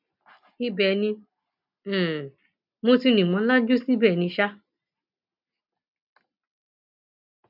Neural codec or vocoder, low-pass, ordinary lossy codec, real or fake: none; 5.4 kHz; none; real